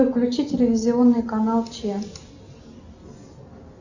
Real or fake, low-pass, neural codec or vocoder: real; 7.2 kHz; none